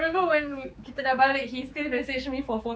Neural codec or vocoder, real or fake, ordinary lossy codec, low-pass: codec, 16 kHz, 4 kbps, X-Codec, HuBERT features, trained on general audio; fake; none; none